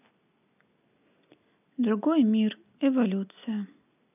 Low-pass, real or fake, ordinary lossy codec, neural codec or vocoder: 3.6 kHz; real; none; none